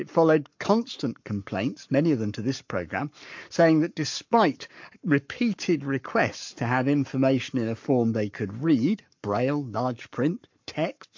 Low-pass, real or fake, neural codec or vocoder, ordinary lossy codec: 7.2 kHz; fake; codec, 44.1 kHz, 7.8 kbps, Pupu-Codec; MP3, 48 kbps